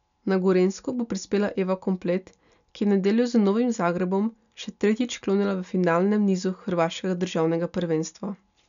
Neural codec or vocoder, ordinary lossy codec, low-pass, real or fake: none; none; 7.2 kHz; real